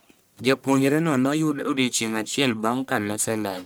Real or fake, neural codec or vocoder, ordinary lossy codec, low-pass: fake; codec, 44.1 kHz, 1.7 kbps, Pupu-Codec; none; none